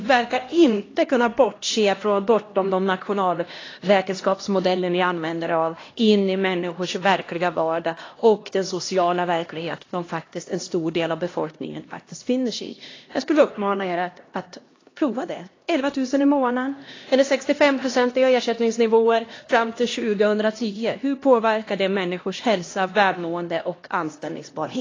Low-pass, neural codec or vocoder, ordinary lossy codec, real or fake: 7.2 kHz; codec, 16 kHz, 1 kbps, X-Codec, HuBERT features, trained on LibriSpeech; AAC, 32 kbps; fake